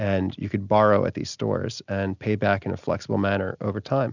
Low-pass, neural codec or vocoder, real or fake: 7.2 kHz; none; real